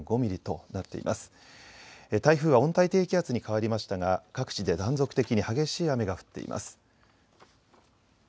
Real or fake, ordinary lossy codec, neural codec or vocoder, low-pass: real; none; none; none